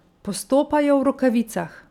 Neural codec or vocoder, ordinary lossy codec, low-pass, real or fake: none; none; 19.8 kHz; real